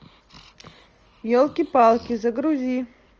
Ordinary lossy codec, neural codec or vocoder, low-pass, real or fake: Opus, 24 kbps; none; 7.2 kHz; real